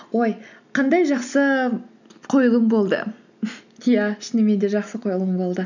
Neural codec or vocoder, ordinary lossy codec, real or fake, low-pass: none; none; real; 7.2 kHz